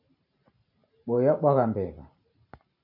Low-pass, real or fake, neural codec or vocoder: 5.4 kHz; fake; vocoder, 44.1 kHz, 128 mel bands every 512 samples, BigVGAN v2